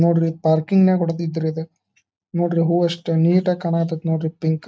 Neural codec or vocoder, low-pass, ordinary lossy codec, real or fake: none; none; none; real